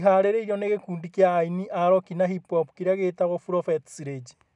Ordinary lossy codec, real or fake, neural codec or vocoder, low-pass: none; real; none; 10.8 kHz